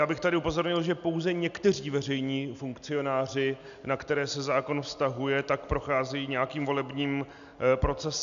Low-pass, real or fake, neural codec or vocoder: 7.2 kHz; real; none